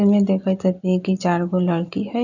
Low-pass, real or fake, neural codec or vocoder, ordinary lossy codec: 7.2 kHz; real; none; none